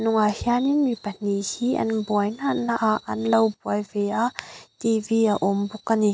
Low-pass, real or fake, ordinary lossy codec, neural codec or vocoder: none; real; none; none